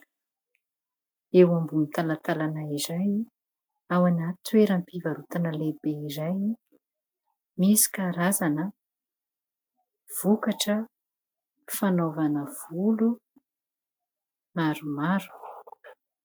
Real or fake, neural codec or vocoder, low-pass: real; none; 19.8 kHz